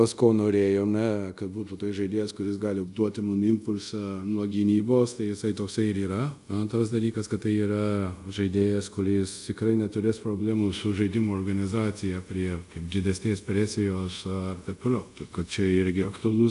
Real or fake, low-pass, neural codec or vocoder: fake; 10.8 kHz; codec, 24 kHz, 0.5 kbps, DualCodec